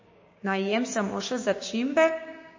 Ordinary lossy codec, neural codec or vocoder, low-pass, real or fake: MP3, 32 kbps; codec, 16 kHz, 6 kbps, DAC; 7.2 kHz; fake